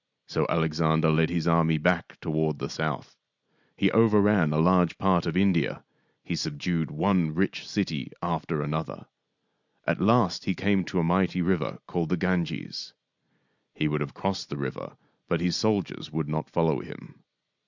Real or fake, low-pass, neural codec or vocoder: real; 7.2 kHz; none